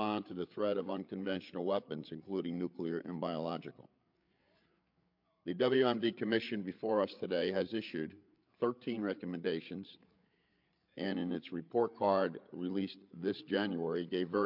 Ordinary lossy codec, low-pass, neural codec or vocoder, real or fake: MP3, 48 kbps; 5.4 kHz; codec, 16 kHz, 8 kbps, FreqCodec, larger model; fake